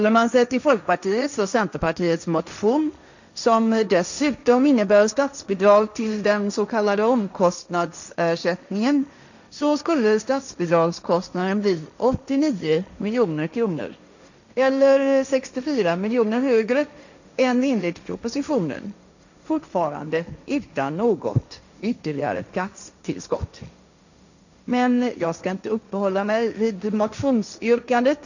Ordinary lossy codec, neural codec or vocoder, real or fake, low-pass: none; codec, 16 kHz, 1.1 kbps, Voila-Tokenizer; fake; 7.2 kHz